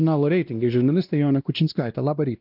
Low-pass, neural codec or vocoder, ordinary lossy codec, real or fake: 5.4 kHz; codec, 16 kHz, 1 kbps, X-Codec, WavLM features, trained on Multilingual LibriSpeech; Opus, 24 kbps; fake